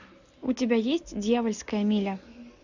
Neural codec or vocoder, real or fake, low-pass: none; real; 7.2 kHz